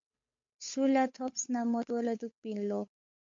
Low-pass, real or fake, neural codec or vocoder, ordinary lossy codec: 7.2 kHz; fake; codec, 16 kHz, 8 kbps, FunCodec, trained on Chinese and English, 25 frames a second; MP3, 48 kbps